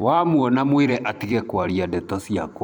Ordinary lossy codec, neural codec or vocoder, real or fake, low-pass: MP3, 96 kbps; vocoder, 44.1 kHz, 128 mel bands every 512 samples, BigVGAN v2; fake; 19.8 kHz